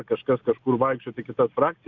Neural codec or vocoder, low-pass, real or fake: none; 7.2 kHz; real